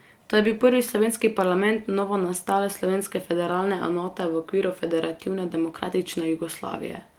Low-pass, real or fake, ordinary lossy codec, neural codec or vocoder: 19.8 kHz; real; Opus, 24 kbps; none